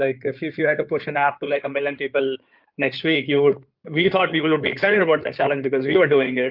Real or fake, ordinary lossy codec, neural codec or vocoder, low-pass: fake; Opus, 32 kbps; codec, 16 kHz in and 24 kHz out, 2.2 kbps, FireRedTTS-2 codec; 5.4 kHz